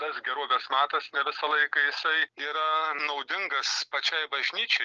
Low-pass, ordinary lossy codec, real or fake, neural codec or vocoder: 7.2 kHz; Opus, 24 kbps; real; none